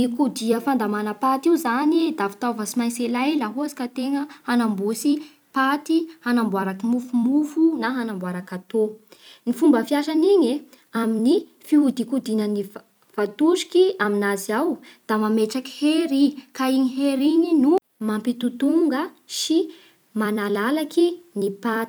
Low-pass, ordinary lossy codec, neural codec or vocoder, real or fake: none; none; vocoder, 44.1 kHz, 128 mel bands every 256 samples, BigVGAN v2; fake